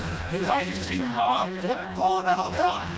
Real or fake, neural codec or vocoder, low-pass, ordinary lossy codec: fake; codec, 16 kHz, 1 kbps, FreqCodec, smaller model; none; none